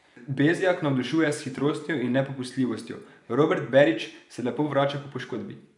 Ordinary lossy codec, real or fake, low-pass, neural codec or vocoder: none; fake; 10.8 kHz; vocoder, 24 kHz, 100 mel bands, Vocos